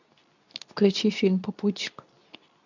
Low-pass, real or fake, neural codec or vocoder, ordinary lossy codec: 7.2 kHz; fake; codec, 24 kHz, 0.9 kbps, WavTokenizer, medium speech release version 2; none